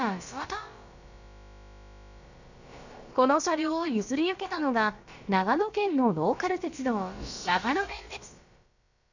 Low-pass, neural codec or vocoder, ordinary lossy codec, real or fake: 7.2 kHz; codec, 16 kHz, about 1 kbps, DyCAST, with the encoder's durations; none; fake